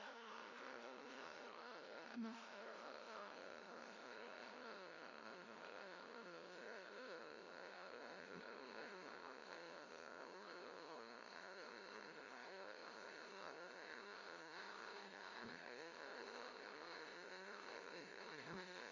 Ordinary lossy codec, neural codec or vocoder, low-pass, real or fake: none; codec, 16 kHz, 0.5 kbps, FunCodec, trained on LibriTTS, 25 frames a second; 7.2 kHz; fake